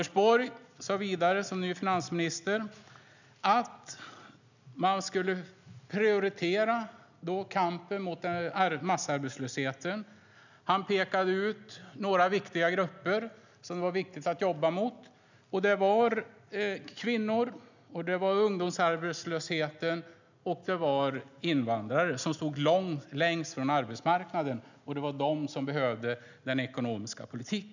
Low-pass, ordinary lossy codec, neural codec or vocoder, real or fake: 7.2 kHz; none; none; real